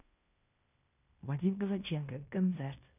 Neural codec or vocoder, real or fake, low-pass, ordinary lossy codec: codec, 16 kHz in and 24 kHz out, 0.9 kbps, LongCat-Audio-Codec, fine tuned four codebook decoder; fake; 3.6 kHz; none